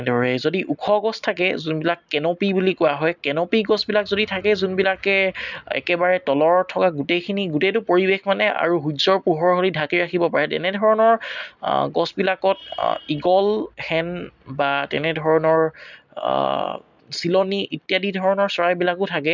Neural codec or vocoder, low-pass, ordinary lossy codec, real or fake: none; 7.2 kHz; none; real